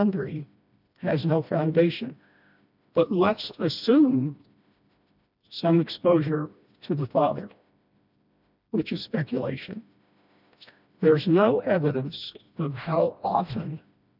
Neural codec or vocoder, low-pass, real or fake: codec, 16 kHz, 1 kbps, FreqCodec, smaller model; 5.4 kHz; fake